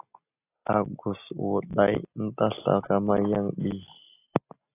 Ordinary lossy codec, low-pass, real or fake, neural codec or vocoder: AAC, 24 kbps; 3.6 kHz; real; none